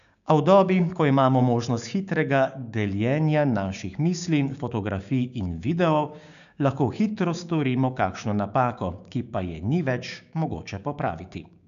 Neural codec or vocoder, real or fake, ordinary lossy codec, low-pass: codec, 16 kHz, 6 kbps, DAC; fake; none; 7.2 kHz